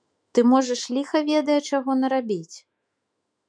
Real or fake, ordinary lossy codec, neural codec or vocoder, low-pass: fake; MP3, 96 kbps; autoencoder, 48 kHz, 128 numbers a frame, DAC-VAE, trained on Japanese speech; 9.9 kHz